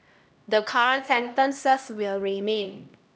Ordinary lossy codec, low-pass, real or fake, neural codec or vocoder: none; none; fake; codec, 16 kHz, 1 kbps, X-Codec, HuBERT features, trained on LibriSpeech